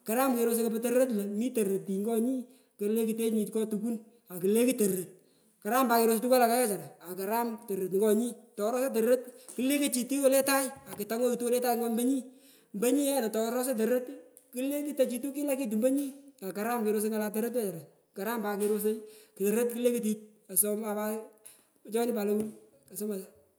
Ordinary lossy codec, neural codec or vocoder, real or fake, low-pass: none; none; real; none